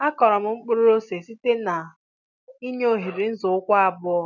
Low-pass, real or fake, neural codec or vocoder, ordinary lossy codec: 7.2 kHz; real; none; none